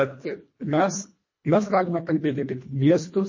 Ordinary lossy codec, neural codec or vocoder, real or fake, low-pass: MP3, 32 kbps; codec, 24 kHz, 1.5 kbps, HILCodec; fake; 7.2 kHz